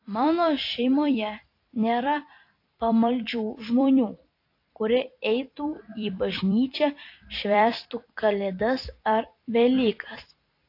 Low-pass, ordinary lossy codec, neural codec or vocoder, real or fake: 5.4 kHz; AAC, 32 kbps; vocoder, 44.1 kHz, 128 mel bands every 256 samples, BigVGAN v2; fake